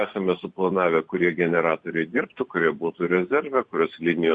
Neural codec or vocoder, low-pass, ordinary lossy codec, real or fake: vocoder, 44.1 kHz, 128 mel bands every 512 samples, BigVGAN v2; 9.9 kHz; Opus, 64 kbps; fake